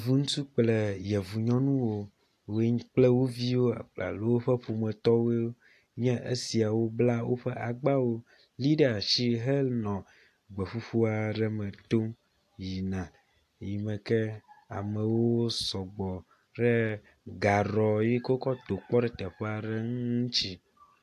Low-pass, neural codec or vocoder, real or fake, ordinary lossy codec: 14.4 kHz; none; real; AAC, 64 kbps